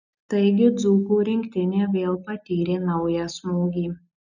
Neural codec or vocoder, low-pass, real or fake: none; 7.2 kHz; real